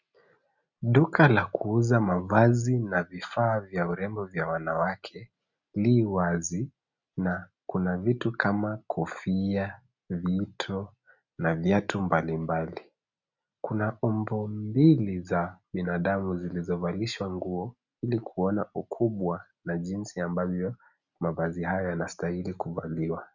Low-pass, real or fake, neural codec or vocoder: 7.2 kHz; real; none